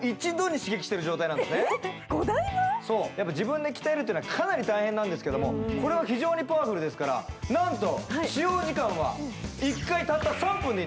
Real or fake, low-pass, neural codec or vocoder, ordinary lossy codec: real; none; none; none